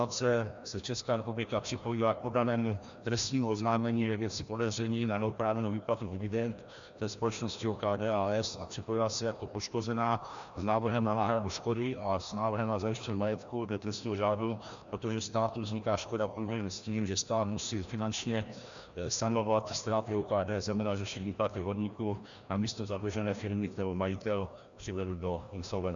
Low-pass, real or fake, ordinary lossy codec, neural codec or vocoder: 7.2 kHz; fake; Opus, 64 kbps; codec, 16 kHz, 1 kbps, FreqCodec, larger model